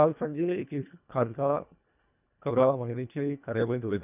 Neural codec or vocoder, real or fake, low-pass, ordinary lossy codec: codec, 24 kHz, 1.5 kbps, HILCodec; fake; 3.6 kHz; none